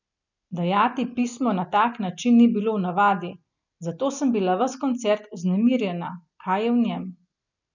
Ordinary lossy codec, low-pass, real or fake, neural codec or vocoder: none; 7.2 kHz; real; none